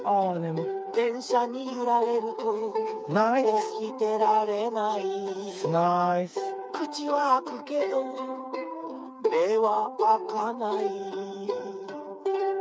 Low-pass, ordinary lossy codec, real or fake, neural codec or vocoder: none; none; fake; codec, 16 kHz, 4 kbps, FreqCodec, smaller model